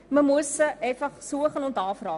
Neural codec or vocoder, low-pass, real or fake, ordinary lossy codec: none; 10.8 kHz; real; none